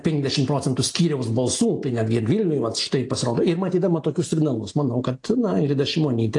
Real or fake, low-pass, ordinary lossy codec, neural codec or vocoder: real; 10.8 kHz; AAC, 48 kbps; none